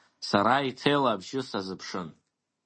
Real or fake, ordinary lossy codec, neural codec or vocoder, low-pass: real; MP3, 32 kbps; none; 9.9 kHz